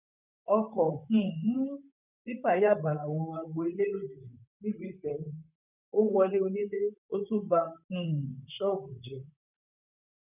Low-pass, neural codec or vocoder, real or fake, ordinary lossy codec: 3.6 kHz; vocoder, 44.1 kHz, 128 mel bands, Pupu-Vocoder; fake; none